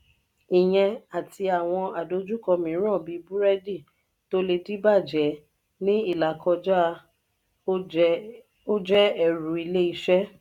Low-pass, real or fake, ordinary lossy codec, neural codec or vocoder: 19.8 kHz; real; none; none